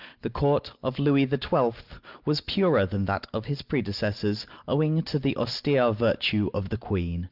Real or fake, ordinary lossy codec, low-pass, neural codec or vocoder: real; Opus, 24 kbps; 5.4 kHz; none